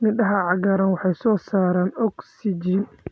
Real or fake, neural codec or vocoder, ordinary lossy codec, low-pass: real; none; none; none